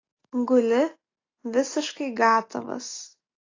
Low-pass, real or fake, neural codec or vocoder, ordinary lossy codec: 7.2 kHz; real; none; AAC, 32 kbps